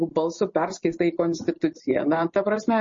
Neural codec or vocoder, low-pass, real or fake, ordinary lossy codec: none; 7.2 kHz; real; MP3, 32 kbps